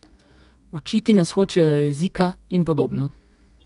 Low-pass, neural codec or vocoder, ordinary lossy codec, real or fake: 10.8 kHz; codec, 24 kHz, 0.9 kbps, WavTokenizer, medium music audio release; none; fake